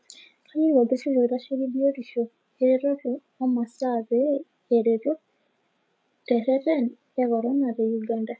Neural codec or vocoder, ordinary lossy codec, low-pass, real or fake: codec, 16 kHz, 16 kbps, FreqCodec, larger model; none; none; fake